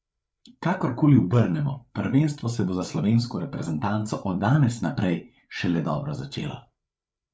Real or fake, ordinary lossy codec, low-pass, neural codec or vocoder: fake; none; none; codec, 16 kHz, 8 kbps, FreqCodec, larger model